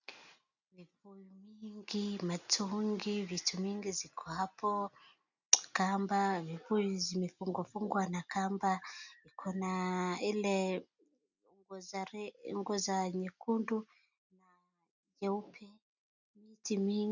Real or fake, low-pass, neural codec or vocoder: real; 7.2 kHz; none